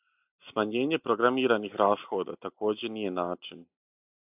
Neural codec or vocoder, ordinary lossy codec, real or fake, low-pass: none; AAC, 32 kbps; real; 3.6 kHz